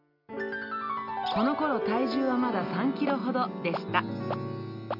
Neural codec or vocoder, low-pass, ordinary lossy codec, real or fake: none; 5.4 kHz; none; real